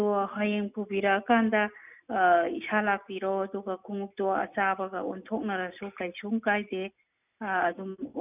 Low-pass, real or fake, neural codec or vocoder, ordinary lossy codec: 3.6 kHz; real; none; none